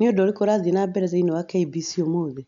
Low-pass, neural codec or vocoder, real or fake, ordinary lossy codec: 7.2 kHz; none; real; none